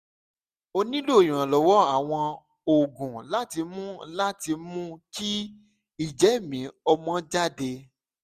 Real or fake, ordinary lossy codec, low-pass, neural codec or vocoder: real; Opus, 64 kbps; 14.4 kHz; none